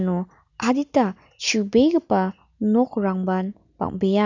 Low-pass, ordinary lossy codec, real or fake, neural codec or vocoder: 7.2 kHz; none; real; none